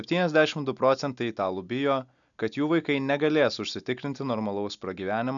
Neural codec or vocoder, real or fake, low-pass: none; real; 7.2 kHz